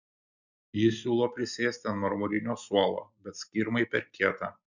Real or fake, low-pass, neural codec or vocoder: real; 7.2 kHz; none